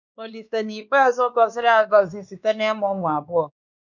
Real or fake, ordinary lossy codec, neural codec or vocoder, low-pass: fake; none; codec, 16 kHz, 2 kbps, X-Codec, WavLM features, trained on Multilingual LibriSpeech; 7.2 kHz